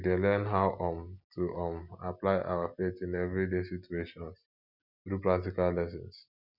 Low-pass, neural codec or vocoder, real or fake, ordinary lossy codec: 5.4 kHz; none; real; none